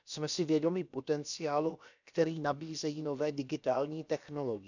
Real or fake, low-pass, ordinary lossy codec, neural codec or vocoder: fake; 7.2 kHz; none; codec, 16 kHz, about 1 kbps, DyCAST, with the encoder's durations